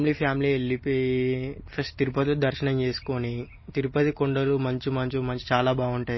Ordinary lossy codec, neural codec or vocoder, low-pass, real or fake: MP3, 24 kbps; none; 7.2 kHz; real